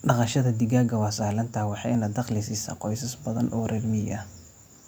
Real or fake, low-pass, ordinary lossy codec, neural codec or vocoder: real; none; none; none